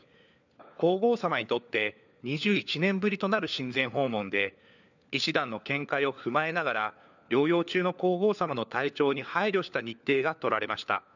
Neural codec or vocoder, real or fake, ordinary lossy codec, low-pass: codec, 16 kHz, 4 kbps, FunCodec, trained on LibriTTS, 50 frames a second; fake; none; 7.2 kHz